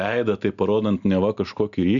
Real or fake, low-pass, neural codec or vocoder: real; 7.2 kHz; none